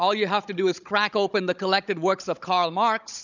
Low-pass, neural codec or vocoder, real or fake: 7.2 kHz; codec, 16 kHz, 16 kbps, FunCodec, trained on Chinese and English, 50 frames a second; fake